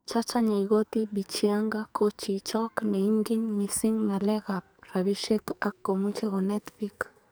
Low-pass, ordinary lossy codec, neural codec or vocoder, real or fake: none; none; codec, 44.1 kHz, 2.6 kbps, SNAC; fake